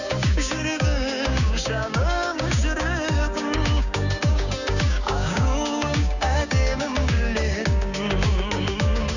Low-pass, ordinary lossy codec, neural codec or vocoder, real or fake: 7.2 kHz; none; codec, 16 kHz, 6 kbps, DAC; fake